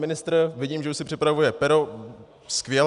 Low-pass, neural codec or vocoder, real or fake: 10.8 kHz; none; real